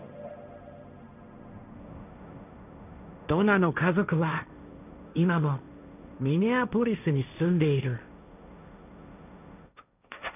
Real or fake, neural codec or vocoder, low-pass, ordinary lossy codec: fake; codec, 16 kHz, 1.1 kbps, Voila-Tokenizer; 3.6 kHz; none